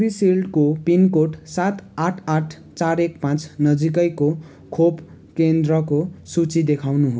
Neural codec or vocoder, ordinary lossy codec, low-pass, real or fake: none; none; none; real